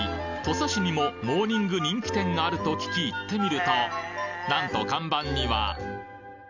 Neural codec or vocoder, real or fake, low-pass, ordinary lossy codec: none; real; 7.2 kHz; none